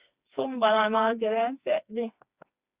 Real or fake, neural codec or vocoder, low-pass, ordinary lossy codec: fake; codec, 16 kHz, 2 kbps, FreqCodec, smaller model; 3.6 kHz; Opus, 64 kbps